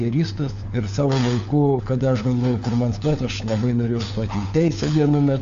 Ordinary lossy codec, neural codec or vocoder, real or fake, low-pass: AAC, 64 kbps; codec, 16 kHz, 4 kbps, FunCodec, trained on LibriTTS, 50 frames a second; fake; 7.2 kHz